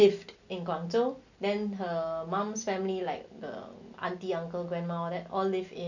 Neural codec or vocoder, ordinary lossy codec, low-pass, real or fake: none; none; 7.2 kHz; real